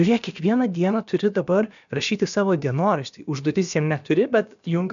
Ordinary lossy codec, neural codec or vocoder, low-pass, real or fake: MP3, 96 kbps; codec, 16 kHz, about 1 kbps, DyCAST, with the encoder's durations; 7.2 kHz; fake